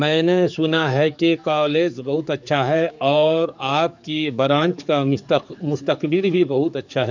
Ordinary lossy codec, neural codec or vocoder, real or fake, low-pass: none; codec, 16 kHz, 4 kbps, X-Codec, HuBERT features, trained on general audio; fake; 7.2 kHz